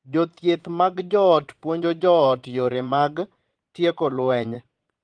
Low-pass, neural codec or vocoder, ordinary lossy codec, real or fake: 9.9 kHz; vocoder, 22.05 kHz, 80 mel bands, Vocos; Opus, 24 kbps; fake